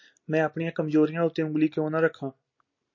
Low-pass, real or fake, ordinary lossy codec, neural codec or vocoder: 7.2 kHz; real; MP3, 32 kbps; none